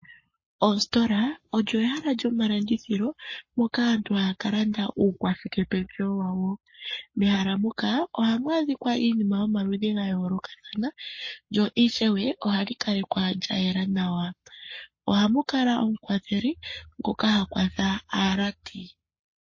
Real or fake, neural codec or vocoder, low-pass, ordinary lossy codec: fake; codec, 44.1 kHz, 7.8 kbps, Pupu-Codec; 7.2 kHz; MP3, 32 kbps